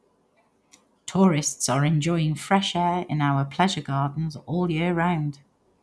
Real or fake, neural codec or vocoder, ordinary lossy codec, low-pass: real; none; none; none